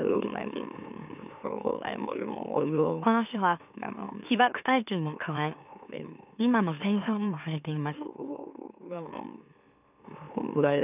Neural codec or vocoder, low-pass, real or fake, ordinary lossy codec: autoencoder, 44.1 kHz, a latent of 192 numbers a frame, MeloTTS; 3.6 kHz; fake; none